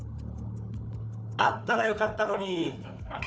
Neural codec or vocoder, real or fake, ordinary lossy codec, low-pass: codec, 16 kHz, 4 kbps, FunCodec, trained on Chinese and English, 50 frames a second; fake; none; none